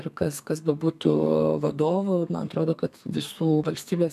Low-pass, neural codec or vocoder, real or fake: 14.4 kHz; codec, 32 kHz, 1.9 kbps, SNAC; fake